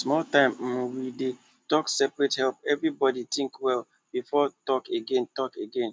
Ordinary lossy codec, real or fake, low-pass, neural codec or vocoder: none; real; none; none